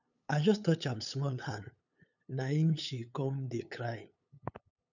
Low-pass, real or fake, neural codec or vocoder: 7.2 kHz; fake; codec, 16 kHz, 8 kbps, FunCodec, trained on LibriTTS, 25 frames a second